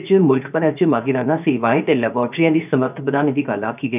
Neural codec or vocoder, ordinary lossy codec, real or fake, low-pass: codec, 16 kHz, 0.7 kbps, FocalCodec; none; fake; 3.6 kHz